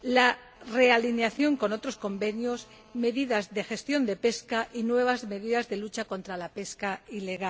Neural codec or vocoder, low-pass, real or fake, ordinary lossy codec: none; none; real; none